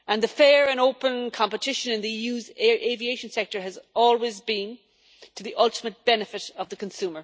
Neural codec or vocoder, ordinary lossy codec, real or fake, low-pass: none; none; real; none